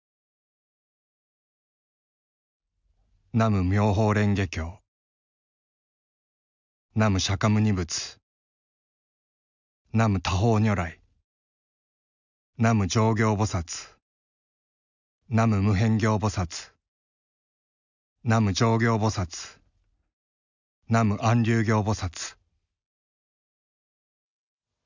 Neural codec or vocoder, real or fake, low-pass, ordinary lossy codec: none; real; 7.2 kHz; none